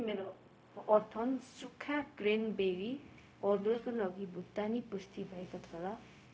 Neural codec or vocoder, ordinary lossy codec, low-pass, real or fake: codec, 16 kHz, 0.4 kbps, LongCat-Audio-Codec; none; none; fake